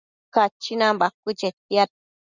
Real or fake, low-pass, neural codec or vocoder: real; 7.2 kHz; none